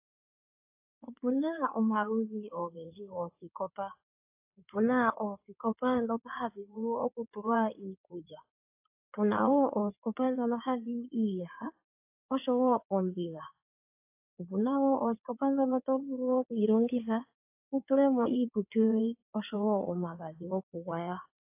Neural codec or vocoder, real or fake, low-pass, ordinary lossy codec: codec, 16 kHz in and 24 kHz out, 2.2 kbps, FireRedTTS-2 codec; fake; 3.6 kHz; AAC, 32 kbps